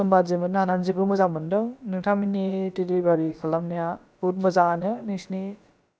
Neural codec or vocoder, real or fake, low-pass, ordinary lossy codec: codec, 16 kHz, about 1 kbps, DyCAST, with the encoder's durations; fake; none; none